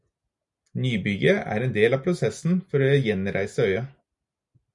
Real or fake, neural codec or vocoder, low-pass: real; none; 10.8 kHz